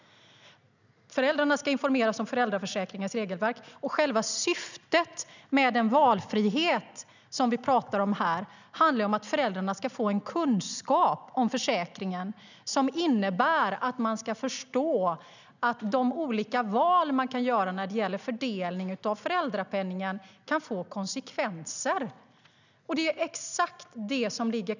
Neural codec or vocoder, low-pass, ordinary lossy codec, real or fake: none; 7.2 kHz; none; real